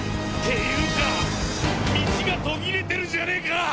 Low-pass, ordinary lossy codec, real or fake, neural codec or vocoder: none; none; real; none